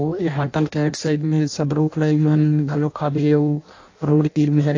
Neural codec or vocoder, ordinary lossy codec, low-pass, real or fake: codec, 16 kHz in and 24 kHz out, 0.6 kbps, FireRedTTS-2 codec; AAC, 48 kbps; 7.2 kHz; fake